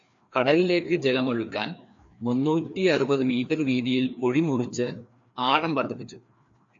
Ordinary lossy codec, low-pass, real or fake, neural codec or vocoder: AAC, 64 kbps; 7.2 kHz; fake; codec, 16 kHz, 2 kbps, FreqCodec, larger model